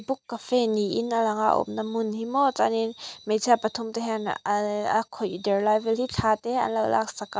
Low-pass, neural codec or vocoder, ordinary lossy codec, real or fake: none; none; none; real